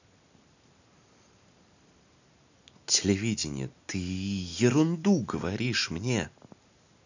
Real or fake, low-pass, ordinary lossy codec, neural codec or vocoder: real; 7.2 kHz; none; none